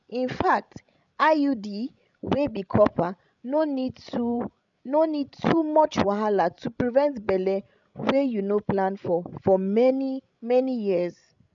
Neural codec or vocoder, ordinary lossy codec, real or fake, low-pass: codec, 16 kHz, 16 kbps, FreqCodec, larger model; none; fake; 7.2 kHz